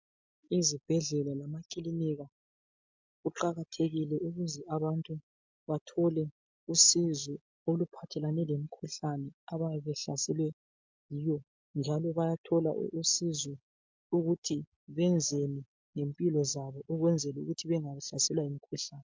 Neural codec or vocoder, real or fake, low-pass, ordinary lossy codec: none; real; 7.2 kHz; MP3, 64 kbps